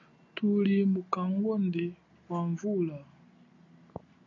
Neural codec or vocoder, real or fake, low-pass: none; real; 7.2 kHz